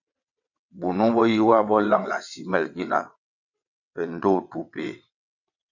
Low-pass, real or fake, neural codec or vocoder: 7.2 kHz; fake; vocoder, 22.05 kHz, 80 mel bands, WaveNeXt